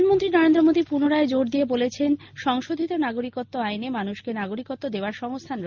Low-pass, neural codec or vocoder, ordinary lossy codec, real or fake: 7.2 kHz; none; Opus, 24 kbps; real